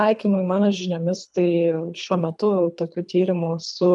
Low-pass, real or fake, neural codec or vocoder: 10.8 kHz; fake; codec, 24 kHz, 3 kbps, HILCodec